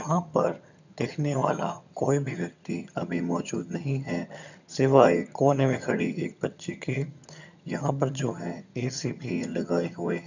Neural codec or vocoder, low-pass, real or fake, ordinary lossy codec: vocoder, 22.05 kHz, 80 mel bands, HiFi-GAN; 7.2 kHz; fake; none